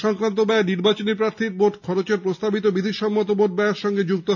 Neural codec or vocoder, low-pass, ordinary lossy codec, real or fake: none; 7.2 kHz; none; real